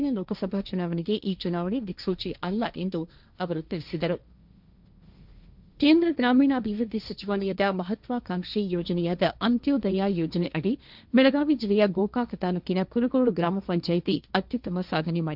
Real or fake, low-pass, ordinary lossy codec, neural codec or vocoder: fake; 5.4 kHz; none; codec, 16 kHz, 1.1 kbps, Voila-Tokenizer